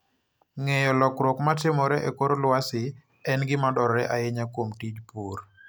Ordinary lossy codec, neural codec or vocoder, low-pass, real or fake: none; none; none; real